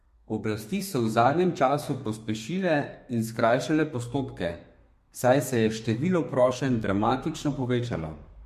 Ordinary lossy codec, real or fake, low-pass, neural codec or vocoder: MP3, 64 kbps; fake; 14.4 kHz; codec, 32 kHz, 1.9 kbps, SNAC